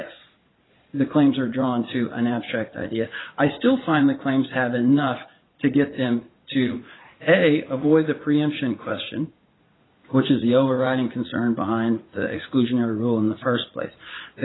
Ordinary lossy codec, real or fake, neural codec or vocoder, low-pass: AAC, 16 kbps; fake; vocoder, 44.1 kHz, 80 mel bands, Vocos; 7.2 kHz